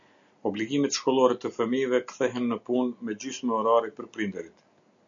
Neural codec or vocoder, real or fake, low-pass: none; real; 7.2 kHz